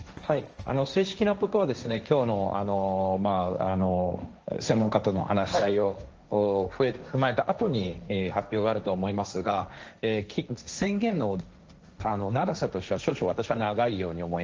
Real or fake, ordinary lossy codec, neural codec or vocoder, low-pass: fake; Opus, 24 kbps; codec, 16 kHz, 1.1 kbps, Voila-Tokenizer; 7.2 kHz